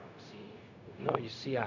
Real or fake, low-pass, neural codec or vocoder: fake; 7.2 kHz; codec, 16 kHz, 0.4 kbps, LongCat-Audio-Codec